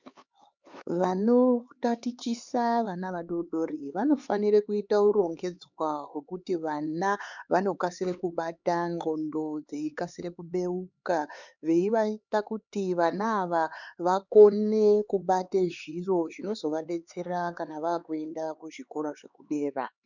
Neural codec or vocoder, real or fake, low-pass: codec, 16 kHz, 4 kbps, X-Codec, HuBERT features, trained on LibriSpeech; fake; 7.2 kHz